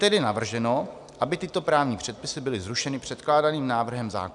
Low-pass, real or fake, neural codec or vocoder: 10.8 kHz; real; none